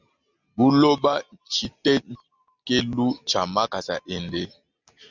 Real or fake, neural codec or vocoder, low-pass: real; none; 7.2 kHz